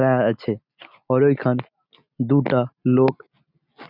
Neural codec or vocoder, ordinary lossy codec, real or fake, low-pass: none; none; real; 5.4 kHz